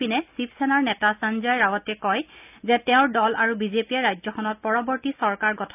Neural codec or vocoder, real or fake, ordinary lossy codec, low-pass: none; real; none; 3.6 kHz